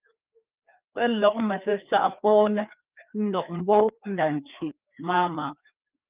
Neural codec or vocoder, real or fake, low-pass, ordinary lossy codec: codec, 16 kHz, 2 kbps, FreqCodec, larger model; fake; 3.6 kHz; Opus, 32 kbps